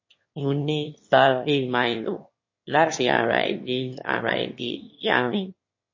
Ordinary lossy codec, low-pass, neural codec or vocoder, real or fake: MP3, 32 kbps; 7.2 kHz; autoencoder, 22.05 kHz, a latent of 192 numbers a frame, VITS, trained on one speaker; fake